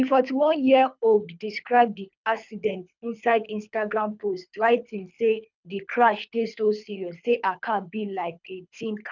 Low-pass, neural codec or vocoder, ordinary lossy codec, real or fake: 7.2 kHz; codec, 24 kHz, 3 kbps, HILCodec; none; fake